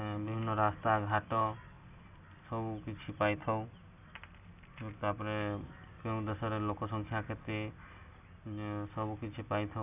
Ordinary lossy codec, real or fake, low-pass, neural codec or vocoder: none; real; 3.6 kHz; none